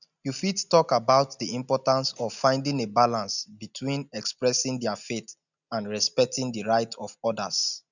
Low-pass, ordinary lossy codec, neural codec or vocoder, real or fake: 7.2 kHz; none; none; real